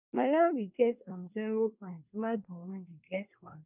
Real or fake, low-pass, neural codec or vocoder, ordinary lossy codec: fake; 3.6 kHz; codec, 24 kHz, 1 kbps, SNAC; none